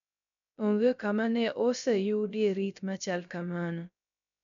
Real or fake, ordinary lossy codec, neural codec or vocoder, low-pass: fake; none; codec, 16 kHz, 0.3 kbps, FocalCodec; 7.2 kHz